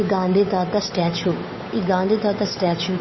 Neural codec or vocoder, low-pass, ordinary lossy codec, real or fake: codec, 16 kHz, 8 kbps, FunCodec, trained on Chinese and English, 25 frames a second; 7.2 kHz; MP3, 24 kbps; fake